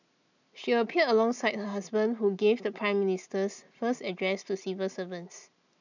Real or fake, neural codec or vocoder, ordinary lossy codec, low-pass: real; none; none; 7.2 kHz